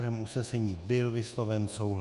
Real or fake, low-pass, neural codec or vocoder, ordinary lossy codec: fake; 10.8 kHz; codec, 24 kHz, 1.2 kbps, DualCodec; Opus, 64 kbps